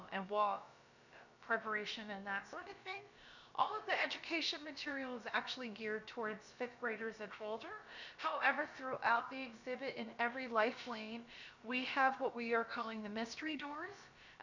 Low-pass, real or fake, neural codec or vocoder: 7.2 kHz; fake; codec, 16 kHz, about 1 kbps, DyCAST, with the encoder's durations